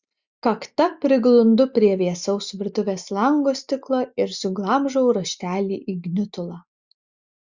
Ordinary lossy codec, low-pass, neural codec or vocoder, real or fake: Opus, 64 kbps; 7.2 kHz; none; real